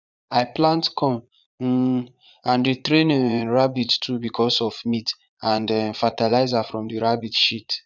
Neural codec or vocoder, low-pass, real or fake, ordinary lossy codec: vocoder, 22.05 kHz, 80 mel bands, Vocos; 7.2 kHz; fake; none